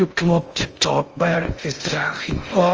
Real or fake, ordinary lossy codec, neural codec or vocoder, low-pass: fake; Opus, 24 kbps; codec, 16 kHz in and 24 kHz out, 0.6 kbps, FocalCodec, streaming, 2048 codes; 7.2 kHz